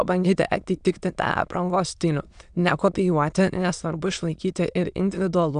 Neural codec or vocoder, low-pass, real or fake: autoencoder, 22.05 kHz, a latent of 192 numbers a frame, VITS, trained on many speakers; 9.9 kHz; fake